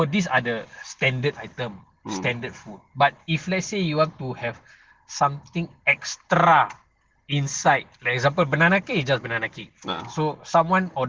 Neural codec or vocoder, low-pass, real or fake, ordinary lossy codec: none; 7.2 kHz; real; Opus, 16 kbps